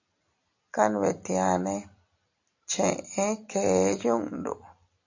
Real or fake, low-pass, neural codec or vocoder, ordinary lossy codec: real; 7.2 kHz; none; AAC, 48 kbps